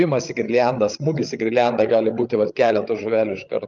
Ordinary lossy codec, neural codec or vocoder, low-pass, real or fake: Opus, 32 kbps; codec, 16 kHz, 8 kbps, FreqCodec, larger model; 7.2 kHz; fake